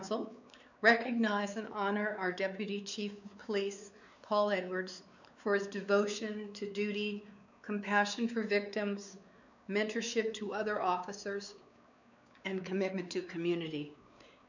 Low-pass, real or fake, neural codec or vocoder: 7.2 kHz; fake; codec, 16 kHz, 4 kbps, X-Codec, WavLM features, trained on Multilingual LibriSpeech